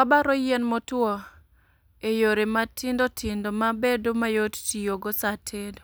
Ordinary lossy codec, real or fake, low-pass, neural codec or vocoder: none; real; none; none